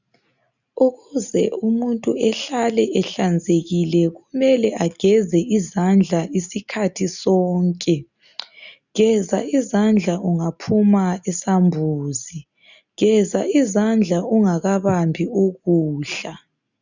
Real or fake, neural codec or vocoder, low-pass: real; none; 7.2 kHz